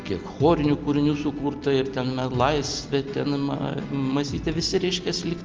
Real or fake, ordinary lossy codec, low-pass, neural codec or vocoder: real; Opus, 32 kbps; 7.2 kHz; none